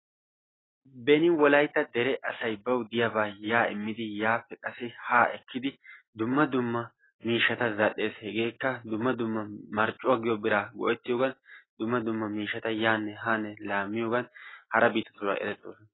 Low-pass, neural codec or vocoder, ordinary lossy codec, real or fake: 7.2 kHz; none; AAC, 16 kbps; real